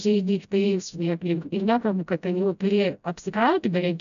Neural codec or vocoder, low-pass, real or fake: codec, 16 kHz, 0.5 kbps, FreqCodec, smaller model; 7.2 kHz; fake